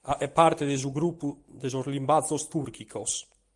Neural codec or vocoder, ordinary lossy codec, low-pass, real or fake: none; Opus, 24 kbps; 10.8 kHz; real